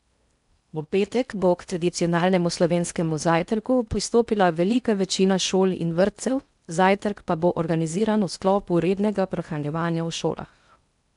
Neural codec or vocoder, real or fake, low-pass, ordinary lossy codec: codec, 16 kHz in and 24 kHz out, 0.6 kbps, FocalCodec, streaming, 4096 codes; fake; 10.8 kHz; none